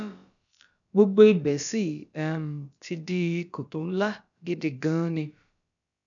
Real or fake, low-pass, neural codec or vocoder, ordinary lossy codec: fake; 7.2 kHz; codec, 16 kHz, about 1 kbps, DyCAST, with the encoder's durations; none